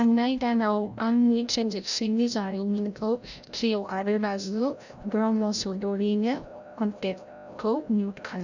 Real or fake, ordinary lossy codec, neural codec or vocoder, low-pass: fake; none; codec, 16 kHz, 0.5 kbps, FreqCodec, larger model; 7.2 kHz